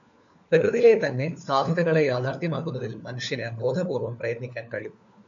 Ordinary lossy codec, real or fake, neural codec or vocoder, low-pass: AAC, 64 kbps; fake; codec, 16 kHz, 4 kbps, FunCodec, trained on LibriTTS, 50 frames a second; 7.2 kHz